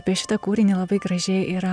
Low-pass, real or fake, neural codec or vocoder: 9.9 kHz; real; none